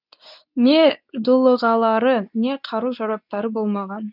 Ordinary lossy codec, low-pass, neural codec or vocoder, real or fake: none; 5.4 kHz; codec, 24 kHz, 0.9 kbps, WavTokenizer, medium speech release version 2; fake